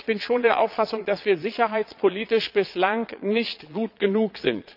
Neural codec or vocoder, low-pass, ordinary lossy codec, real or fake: vocoder, 22.05 kHz, 80 mel bands, Vocos; 5.4 kHz; none; fake